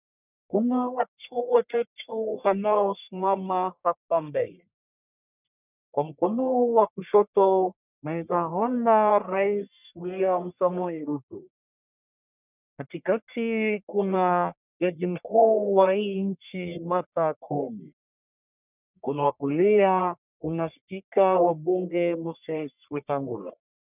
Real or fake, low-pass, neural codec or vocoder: fake; 3.6 kHz; codec, 44.1 kHz, 1.7 kbps, Pupu-Codec